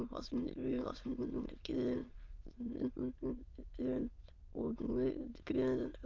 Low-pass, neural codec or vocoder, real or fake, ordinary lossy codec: 7.2 kHz; autoencoder, 22.05 kHz, a latent of 192 numbers a frame, VITS, trained on many speakers; fake; Opus, 32 kbps